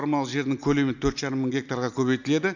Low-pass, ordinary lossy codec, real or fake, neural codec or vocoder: 7.2 kHz; none; real; none